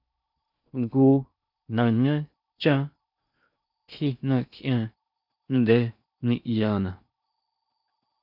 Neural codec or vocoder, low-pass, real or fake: codec, 16 kHz in and 24 kHz out, 0.6 kbps, FocalCodec, streaming, 4096 codes; 5.4 kHz; fake